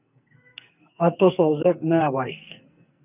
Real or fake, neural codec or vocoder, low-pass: fake; codec, 44.1 kHz, 2.6 kbps, SNAC; 3.6 kHz